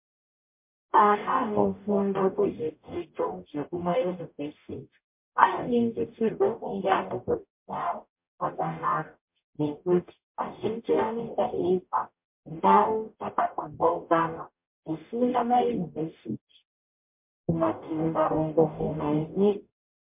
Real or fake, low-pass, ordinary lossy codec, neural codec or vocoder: fake; 3.6 kHz; MP3, 32 kbps; codec, 44.1 kHz, 0.9 kbps, DAC